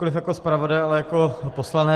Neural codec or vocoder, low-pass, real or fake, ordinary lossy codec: none; 14.4 kHz; real; Opus, 16 kbps